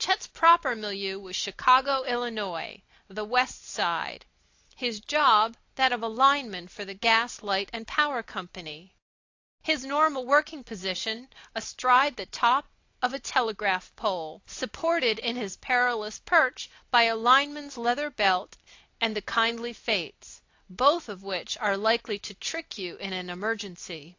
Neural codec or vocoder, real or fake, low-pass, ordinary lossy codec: none; real; 7.2 kHz; AAC, 48 kbps